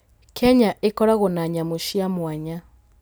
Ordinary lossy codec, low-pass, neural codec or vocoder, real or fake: none; none; none; real